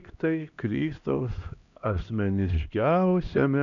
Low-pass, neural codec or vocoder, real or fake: 7.2 kHz; codec, 16 kHz, 1 kbps, X-Codec, HuBERT features, trained on LibriSpeech; fake